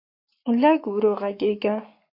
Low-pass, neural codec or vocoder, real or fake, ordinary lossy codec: 5.4 kHz; codec, 44.1 kHz, 7.8 kbps, Pupu-Codec; fake; MP3, 32 kbps